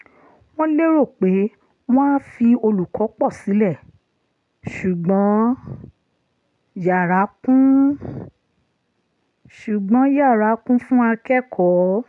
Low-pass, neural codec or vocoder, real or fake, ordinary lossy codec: 10.8 kHz; none; real; none